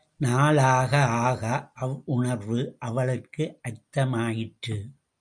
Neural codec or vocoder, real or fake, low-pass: none; real; 9.9 kHz